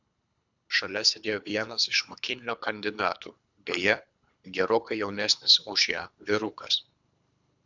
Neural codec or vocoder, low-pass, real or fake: codec, 24 kHz, 3 kbps, HILCodec; 7.2 kHz; fake